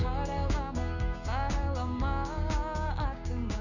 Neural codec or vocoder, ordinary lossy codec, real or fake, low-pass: codec, 16 kHz, 6 kbps, DAC; none; fake; 7.2 kHz